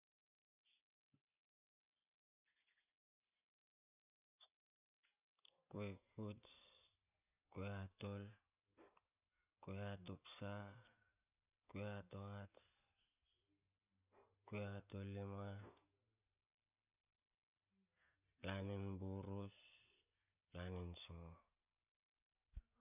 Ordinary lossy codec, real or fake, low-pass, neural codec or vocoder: none; real; 3.6 kHz; none